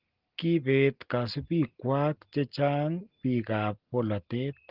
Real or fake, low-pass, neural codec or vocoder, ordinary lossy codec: real; 5.4 kHz; none; Opus, 16 kbps